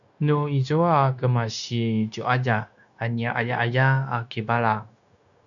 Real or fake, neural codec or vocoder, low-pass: fake; codec, 16 kHz, 0.9 kbps, LongCat-Audio-Codec; 7.2 kHz